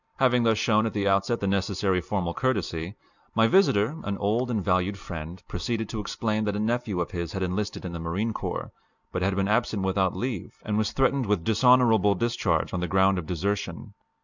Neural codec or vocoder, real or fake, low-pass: none; real; 7.2 kHz